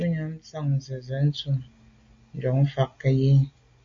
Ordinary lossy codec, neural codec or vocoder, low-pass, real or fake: MP3, 48 kbps; none; 7.2 kHz; real